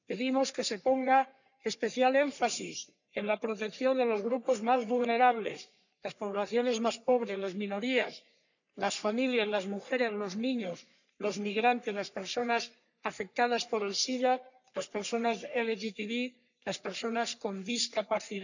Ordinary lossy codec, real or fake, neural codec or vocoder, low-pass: none; fake; codec, 44.1 kHz, 3.4 kbps, Pupu-Codec; 7.2 kHz